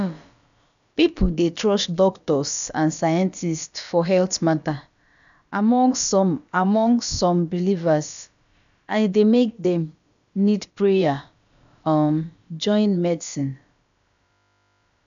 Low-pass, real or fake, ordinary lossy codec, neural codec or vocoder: 7.2 kHz; fake; none; codec, 16 kHz, about 1 kbps, DyCAST, with the encoder's durations